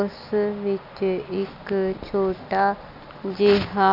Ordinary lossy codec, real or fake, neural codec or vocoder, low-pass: none; real; none; 5.4 kHz